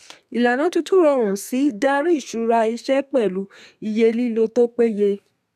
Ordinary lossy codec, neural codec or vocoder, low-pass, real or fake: none; codec, 32 kHz, 1.9 kbps, SNAC; 14.4 kHz; fake